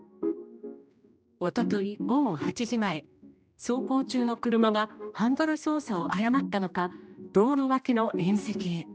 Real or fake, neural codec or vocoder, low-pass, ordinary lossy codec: fake; codec, 16 kHz, 1 kbps, X-Codec, HuBERT features, trained on general audio; none; none